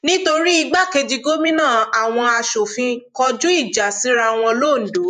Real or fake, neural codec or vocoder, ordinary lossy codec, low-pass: fake; vocoder, 48 kHz, 128 mel bands, Vocos; none; 14.4 kHz